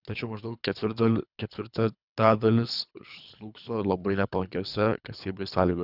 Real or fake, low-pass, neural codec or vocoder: fake; 5.4 kHz; codec, 24 kHz, 3 kbps, HILCodec